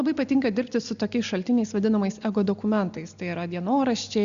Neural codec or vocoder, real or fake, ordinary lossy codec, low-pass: none; real; Opus, 64 kbps; 7.2 kHz